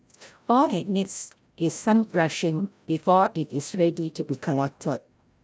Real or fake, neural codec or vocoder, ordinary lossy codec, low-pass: fake; codec, 16 kHz, 0.5 kbps, FreqCodec, larger model; none; none